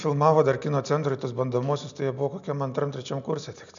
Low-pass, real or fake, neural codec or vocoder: 7.2 kHz; real; none